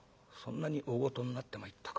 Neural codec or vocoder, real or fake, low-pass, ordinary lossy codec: none; real; none; none